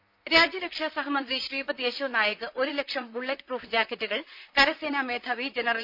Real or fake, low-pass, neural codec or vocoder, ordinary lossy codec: fake; 5.4 kHz; vocoder, 44.1 kHz, 128 mel bands, Pupu-Vocoder; none